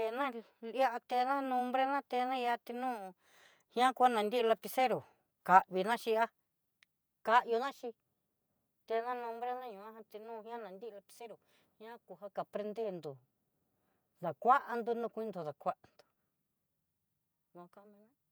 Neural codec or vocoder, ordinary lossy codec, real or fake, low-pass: vocoder, 48 kHz, 128 mel bands, Vocos; none; fake; none